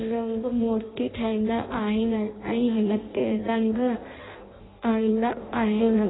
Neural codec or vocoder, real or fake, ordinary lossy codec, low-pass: codec, 16 kHz in and 24 kHz out, 0.6 kbps, FireRedTTS-2 codec; fake; AAC, 16 kbps; 7.2 kHz